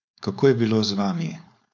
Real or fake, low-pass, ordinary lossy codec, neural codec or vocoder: fake; 7.2 kHz; none; codec, 16 kHz, 4.8 kbps, FACodec